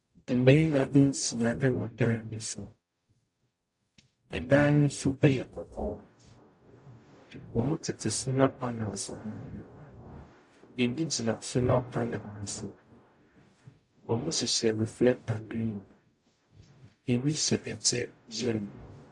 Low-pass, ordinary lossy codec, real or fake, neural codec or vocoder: 10.8 kHz; AAC, 64 kbps; fake; codec, 44.1 kHz, 0.9 kbps, DAC